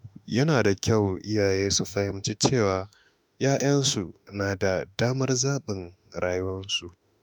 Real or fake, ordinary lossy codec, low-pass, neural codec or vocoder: fake; none; none; autoencoder, 48 kHz, 32 numbers a frame, DAC-VAE, trained on Japanese speech